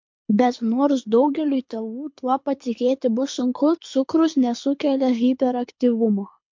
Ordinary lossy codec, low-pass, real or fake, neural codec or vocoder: MP3, 48 kbps; 7.2 kHz; fake; codec, 24 kHz, 6 kbps, HILCodec